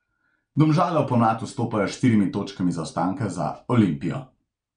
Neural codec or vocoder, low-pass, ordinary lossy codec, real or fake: none; 9.9 kHz; none; real